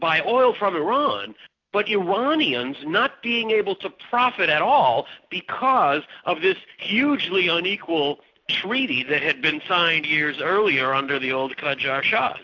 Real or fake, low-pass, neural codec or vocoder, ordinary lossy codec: real; 7.2 kHz; none; AAC, 48 kbps